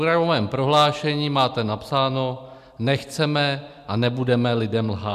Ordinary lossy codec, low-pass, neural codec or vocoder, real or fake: MP3, 96 kbps; 14.4 kHz; none; real